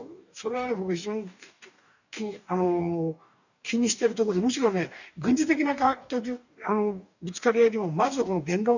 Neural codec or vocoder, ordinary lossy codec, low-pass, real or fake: codec, 44.1 kHz, 2.6 kbps, DAC; none; 7.2 kHz; fake